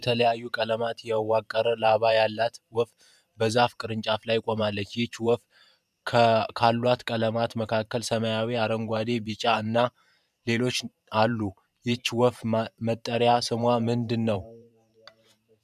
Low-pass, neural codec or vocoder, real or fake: 14.4 kHz; vocoder, 44.1 kHz, 128 mel bands every 512 samples, BigVGAN v2; fake